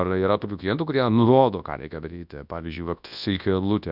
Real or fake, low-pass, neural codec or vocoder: fake; 5.4 kHz; codec, 24 kHz, 0.9 kbps, WavTokenizer, large speech release